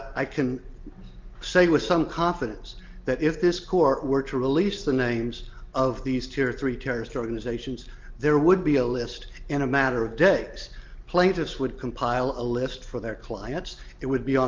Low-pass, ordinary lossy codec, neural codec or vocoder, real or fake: 7.2 kHz; Opus, 24 kbps; none; real